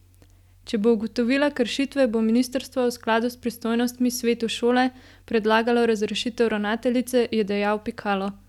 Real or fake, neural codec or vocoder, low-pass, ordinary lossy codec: real; none; 19.8 kHz; none